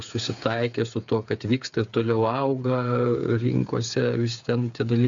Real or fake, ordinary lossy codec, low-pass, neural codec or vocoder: fake; MP3, 64 kbps; 7.2 kHz; codec, 16 kHz, 8 kbps, FreqCodec, smaller model